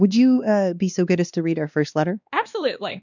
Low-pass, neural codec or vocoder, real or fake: 7.2 kHz; codec, 16 kHz, 2 kbps, X-Codec, WavLM features, trained on Multilingual LibriSpeech; fake